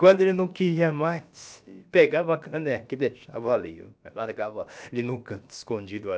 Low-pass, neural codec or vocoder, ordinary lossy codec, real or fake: none; codec, 16 kHz, about 1 kbps, DyCAST, with the encoder's durations; none; fake